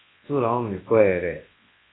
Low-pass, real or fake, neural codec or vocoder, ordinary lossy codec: 7.2 kHz; fake; codec, 24 kHz, 0.9 kbps, WavTokenizer, large speech release; AAC, 16 kbps